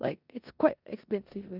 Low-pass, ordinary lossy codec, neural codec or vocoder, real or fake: 5.4 kHz; none; codec, 16 kHz in and 24 kHz out, 0.9 kbps, LongCat-Audio-Codec, four codebook decoder; fake